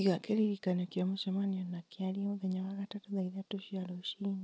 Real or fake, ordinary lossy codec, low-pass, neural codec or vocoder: real; none; none; none